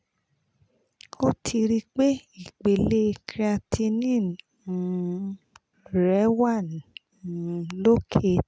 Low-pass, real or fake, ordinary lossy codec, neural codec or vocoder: none; real; none; none